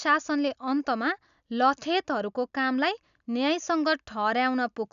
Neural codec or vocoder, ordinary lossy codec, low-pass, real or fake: none; none; 7.2 kHz; real